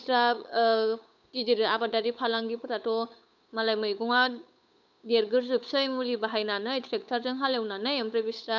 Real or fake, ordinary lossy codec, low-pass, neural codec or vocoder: fake; none; 7.2 kHz; codec, 16 kHz, 8 kbps, FunCodec, trained on Chinese and English, 25 frames a second